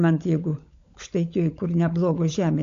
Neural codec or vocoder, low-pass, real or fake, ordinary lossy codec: codec, 16 kHz, 16 kbps, FunCodec, trained on LibriTTS, 50 frames a second; 7.2 kHz; fake; AAC, 48 kbps